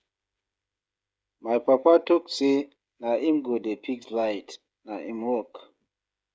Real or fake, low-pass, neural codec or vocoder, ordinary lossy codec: fake; none; codec, 16 kHz, 16 kbps, FreqCodec, smaller model; none